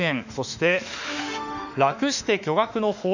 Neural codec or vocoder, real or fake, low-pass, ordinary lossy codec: autoencoder, 48 kHz, 32 numbers a frame, DAC-VAE, trained on Japanese speech; fake; 7.2 kHz; none